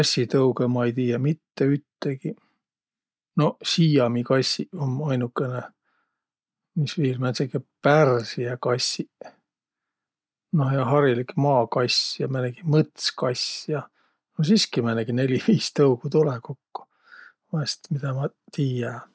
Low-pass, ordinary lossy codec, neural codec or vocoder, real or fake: none; none; none; real